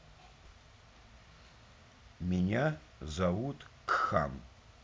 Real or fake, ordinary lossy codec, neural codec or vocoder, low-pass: real; none; none; none